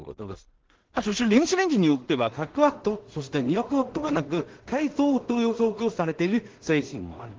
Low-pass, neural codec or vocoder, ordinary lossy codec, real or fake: 7.2 kHz; codec, 16 kHz in and 24 kHz out, 0.4 kbps, LongCat-Audio-Codec, two codebook decoder; Opus, 16 kbps; fake